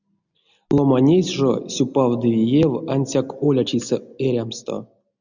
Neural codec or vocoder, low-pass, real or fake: none; 7.2 kHz; real